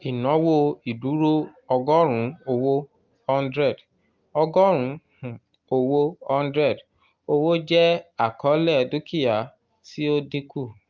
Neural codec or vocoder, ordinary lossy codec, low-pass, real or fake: none; Opus, 32 kbps; 7.2 kHz; real